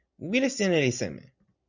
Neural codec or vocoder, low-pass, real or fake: none; 7.2 kHz; real